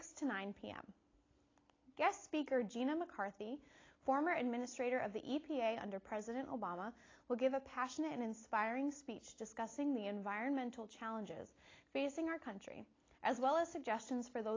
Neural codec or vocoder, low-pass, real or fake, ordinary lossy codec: none; 7.2 kHz; real; AAC, 32 kbps